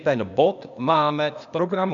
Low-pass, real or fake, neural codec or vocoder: 7.2 kHz; fake; codec, 16 kHz, 0.8 kbps, ZipCodec